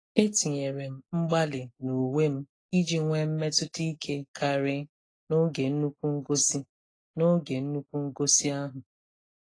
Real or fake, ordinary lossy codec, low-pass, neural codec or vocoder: real; AAC, 32 kbps; 9.9 kHz; none